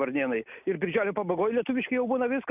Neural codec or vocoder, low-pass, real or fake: none; 3.6 kHz; real